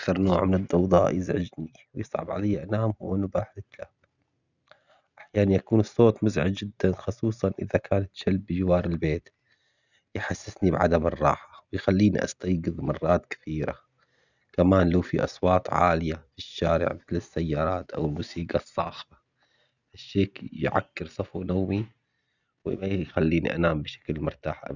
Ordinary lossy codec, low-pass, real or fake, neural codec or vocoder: none; 7.2 kHz; real; none